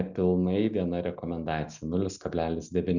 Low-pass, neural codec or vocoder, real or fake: 7.2 kHz; none; real